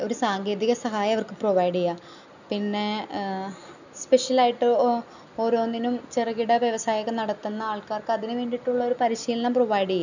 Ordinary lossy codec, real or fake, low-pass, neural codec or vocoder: none; real; 7.2 kHz; none